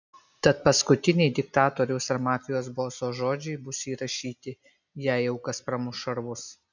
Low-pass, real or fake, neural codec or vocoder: 7.2 kHz; real; none